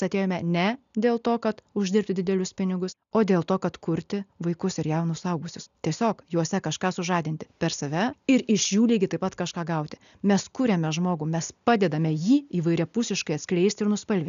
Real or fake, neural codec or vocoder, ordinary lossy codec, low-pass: real; none; MP3, 96 kbps; 7.2 kHz